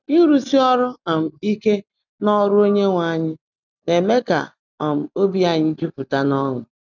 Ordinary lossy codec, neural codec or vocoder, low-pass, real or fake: none; none; 7.2 kHz; real